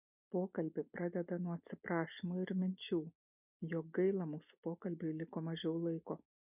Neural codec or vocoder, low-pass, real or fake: none; 3.6 kHz; real